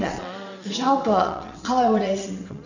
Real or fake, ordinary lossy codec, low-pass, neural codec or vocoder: fake; none; 7.2 kHz; vocoder, 22.05 kHz, 80 mel bands, WaveNeXt